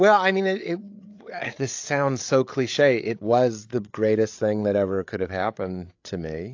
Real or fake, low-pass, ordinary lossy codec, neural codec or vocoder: fake; 7.2 kHz; AAC, 48 kbps; codec, 16 kHz, 8 kbps, FreqCodec, larger model